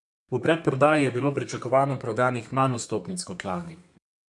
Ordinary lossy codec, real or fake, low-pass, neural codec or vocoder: none; fake; 10.8 kHz; codec, 32 kHz, 1.9 kbps, SNAC